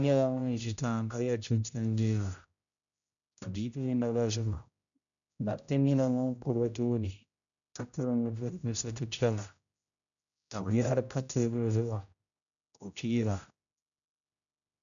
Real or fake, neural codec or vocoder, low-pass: fake; codec, 16 kHz, 0.5 kbps, X-Codec, HuBERT features, trained on general audio; 7.2 kHz